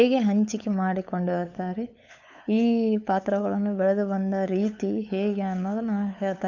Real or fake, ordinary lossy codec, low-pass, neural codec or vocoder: fake; none; 7.2 kHz; codec, 16 kHz, 8 kbps, FunCodec, trained on LibriTTS, 25 frames a second